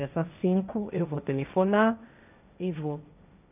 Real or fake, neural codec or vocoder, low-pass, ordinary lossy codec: fake; codec, 16 kHz, 1.1 kbps, Voila-Tokenizer; 3.6 kHz; none